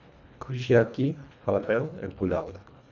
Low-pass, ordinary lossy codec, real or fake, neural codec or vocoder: 7.2 kHz; none; fake; codec, 24 kHz, 1.5 kbps, HILCodec